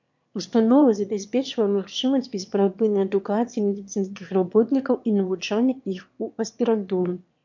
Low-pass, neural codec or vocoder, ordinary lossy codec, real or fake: 7.2 kHz; autoencoder, 22.05 kHz, a latent of 192 numbers a frame, VITS, trained on one speaker; MP3, 48 kbps; fake